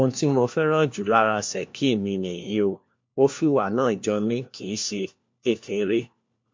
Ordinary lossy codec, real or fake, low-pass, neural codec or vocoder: MP3, 48 kbps; fake; 7.2 kHz; codec, 16 kHz, 1 kbps, FunCodec, trained on LibriTTS, 50 frames a second